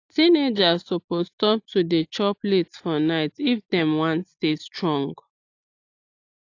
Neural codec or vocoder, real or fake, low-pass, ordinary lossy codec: none; real; 7.2 kHz; AAC, 48 kbps